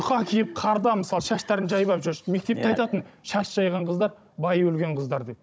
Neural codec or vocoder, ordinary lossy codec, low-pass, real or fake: codec, 16 kHz, 16 kbps, FunCodec, trained on Chinese and English, 50 frames a second; none; none; fake